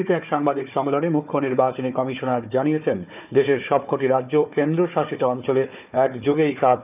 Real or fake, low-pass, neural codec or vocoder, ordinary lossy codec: fake; 3.6 kHz; codec, 16 kHz, 4 kbps, FunCodec, trained on Chinese and English, 50 frames a second; none